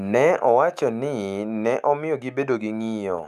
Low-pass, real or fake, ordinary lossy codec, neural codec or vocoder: 14.4 kHz; fake; none; autoencoder, 48 kHz, 128 numbers a frame, DAC-VAE, trained on Japanese speech